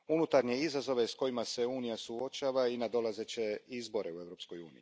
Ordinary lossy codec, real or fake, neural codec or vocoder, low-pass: none; real; none; none